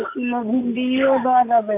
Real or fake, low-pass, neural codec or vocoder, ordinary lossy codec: real; 3.6 kHz; none; MP3, 24 kbps